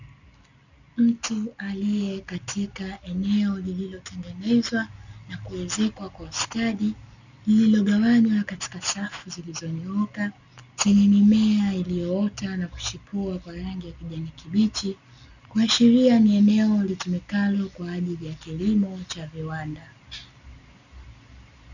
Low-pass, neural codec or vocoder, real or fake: 7.2 kHz; none; real